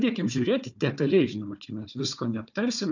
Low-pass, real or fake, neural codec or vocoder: 7.2 kHz; fake; codec, 16 kHz, 4 kbps, FunCodec, trained on Chinese and English, 50 frames a second